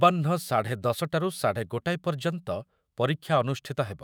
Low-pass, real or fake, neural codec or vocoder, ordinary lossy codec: none; fake; autoencoder, 48 kHz, 128 numbers a frame, DAC-VAE, trained on Japanese speech; none